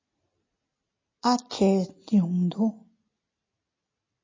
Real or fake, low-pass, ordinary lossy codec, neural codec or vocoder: real; 7.2 kHz; MP3, 32 kbps; none